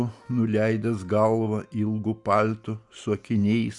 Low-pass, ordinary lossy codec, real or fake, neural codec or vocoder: 10.8 kHz; Opus, 64 kbps; real; none